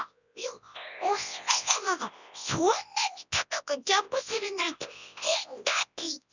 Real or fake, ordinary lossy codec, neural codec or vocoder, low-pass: fake; none; codec, 24 kHz, 0.9 kbps, WavTokenizer, large speech release; 7.2 kHz